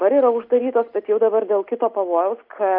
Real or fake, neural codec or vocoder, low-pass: real; none; 5.4 kHz